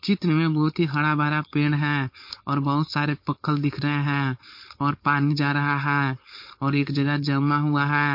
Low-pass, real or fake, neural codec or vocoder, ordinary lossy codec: 5.4 kHz; fake; codec, 16 kHz, 4.8 kbps, FACodec; MP3, 32 kbps